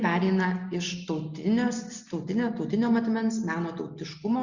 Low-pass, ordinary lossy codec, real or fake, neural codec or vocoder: 7.2 kHz; Opus, 64 kbps; real; none